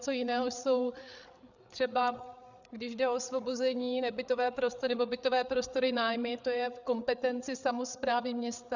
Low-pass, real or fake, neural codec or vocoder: 7.2 kHz; fake; codec, 16 kHz, 8 kbps, FreqCodec, larger model